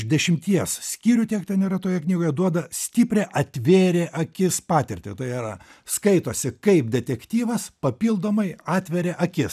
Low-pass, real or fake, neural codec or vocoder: 14.4 kHz; real; none